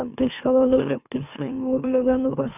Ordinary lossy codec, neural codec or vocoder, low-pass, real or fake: none; autoencoder, 44.1 kHz, a latent of 192 numbers a frame, MeloTTS; 3.6 kHz; fake